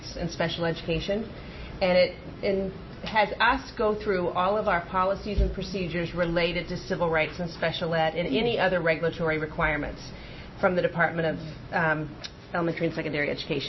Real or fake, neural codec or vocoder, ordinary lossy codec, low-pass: real; none; MP3, 24 kbps; 7.2 kHz